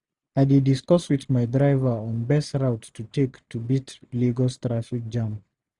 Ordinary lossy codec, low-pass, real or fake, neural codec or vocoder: none; 10.8 kHz; real; none